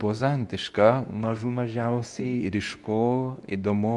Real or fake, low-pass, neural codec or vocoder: fake; 10.8 kHz; codec, 24 kHz, 0.9 kbps, WavTokenizer, medium speech release version 2